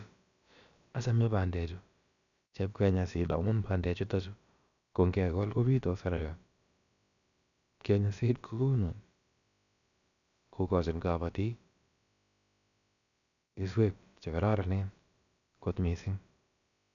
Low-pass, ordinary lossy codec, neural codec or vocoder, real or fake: 7.2 kHz; none; codec, 16 kHz, about 1 kbps, DyCAST, with the encoder's durations; fake